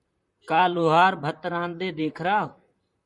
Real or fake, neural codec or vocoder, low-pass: fake; vocoder, 44.1 kHz, 128 mel bands, Pupu-Vocoder; 10.8 kHz